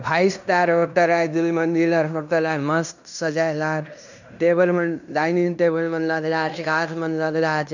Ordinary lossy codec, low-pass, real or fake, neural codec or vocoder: none; 7.2 kHz; fake; codec, 16 kHz in and 24 kHz out, 0.9 kbps, LongCat-Audio-Codec, fine tuned four codebook decoder